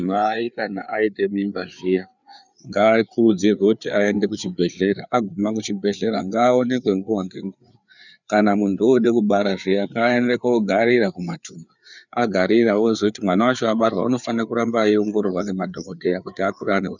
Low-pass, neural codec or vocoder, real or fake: 7.2 kHz; codec, 16 kHz, 4 kbps, FreqCodec, larger model; fake